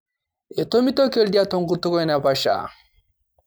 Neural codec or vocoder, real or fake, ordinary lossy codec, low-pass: none; real; none; none